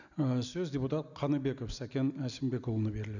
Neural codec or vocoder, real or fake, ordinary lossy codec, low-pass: none; real; none; 7.2 kHz